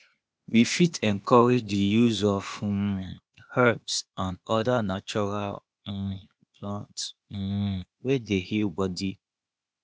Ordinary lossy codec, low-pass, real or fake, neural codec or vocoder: none; none; fake; codec, 16 kHz, 0.8 kbps, ZipCodec